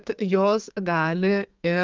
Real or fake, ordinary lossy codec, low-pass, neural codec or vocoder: fake; Opus, 24 kbps; 7.2 kHz; codec, 32 kHz, 1.9 kbps, SNAC